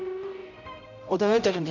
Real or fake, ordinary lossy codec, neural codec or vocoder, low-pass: fake; none; codec, 16 kHz, 0.5 kbps, X-Codec, HuBERT features, trained on general audio; 7.2 kHz